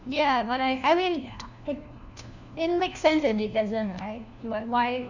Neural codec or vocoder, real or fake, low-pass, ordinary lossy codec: codec, 16 kHz, 1 kbps, FunCodec, trained on LibriTTS, 50 frames a second; fake; 7.2 kHz; none